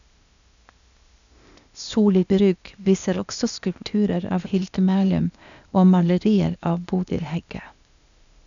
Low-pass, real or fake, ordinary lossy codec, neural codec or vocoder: 7.2 kHz; fake; none; codec, 16 kHz, 0.8 kbps, ZipCodec